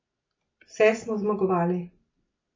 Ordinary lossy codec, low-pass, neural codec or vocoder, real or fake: MP3, 32 kbps; 7.2 kHz; none; real